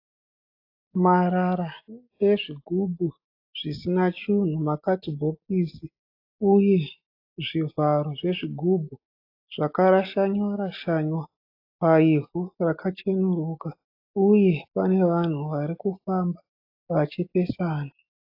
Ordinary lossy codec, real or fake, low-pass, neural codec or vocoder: AAC, 32 kbps; real; 5.4 kHz; none